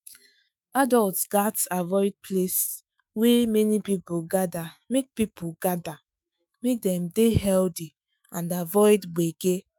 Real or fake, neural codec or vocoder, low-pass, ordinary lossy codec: fake; autoencoder, 48 kHz, 128 numbers a frame, DAC-VAE, trained on Japanese speech; none; none